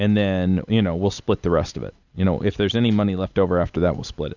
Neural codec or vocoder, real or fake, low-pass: none; real; 7.2 kHz